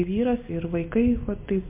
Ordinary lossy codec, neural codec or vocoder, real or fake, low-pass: MP3, 24 kbps; none; real; 3.6 kHz